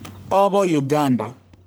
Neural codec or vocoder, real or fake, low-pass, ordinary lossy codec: codec, 44.1 kHz, 1.7 kbps, Pupu-Codec; fake; none; none